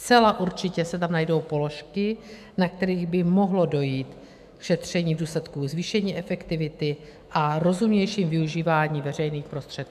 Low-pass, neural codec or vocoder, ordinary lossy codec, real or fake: 14.4 kHz; autoencoder, 48 kHz, 128 numbers a frame, DAC-VAE, trained on Japanese speech; MP3, 96 kbps; fake